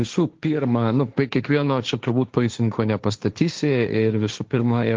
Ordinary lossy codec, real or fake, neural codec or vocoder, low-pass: Opus, 24 kbps; fake; codec, 16 kHz, 1.1 kbps, Voila-Tokenizer; 7.2 kHz